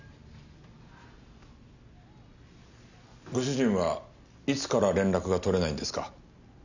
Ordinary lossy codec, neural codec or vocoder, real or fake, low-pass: none; none; real; 7.2 kHz